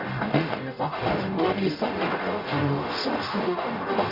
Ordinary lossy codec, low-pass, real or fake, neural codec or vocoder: none; 5.4 kHz; fake; codec, 44.1 kHz, 0.9 kbps, DAC